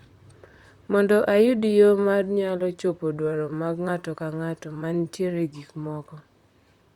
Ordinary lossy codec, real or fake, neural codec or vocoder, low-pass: Opus, 64 kbps; fake; vocoder, 44.1 kHz, 128 mel bands, Pupu-Vocoder; 19.8 kHz